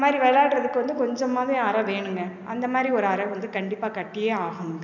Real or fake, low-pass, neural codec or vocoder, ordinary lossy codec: real; 7.2 kHz; none; none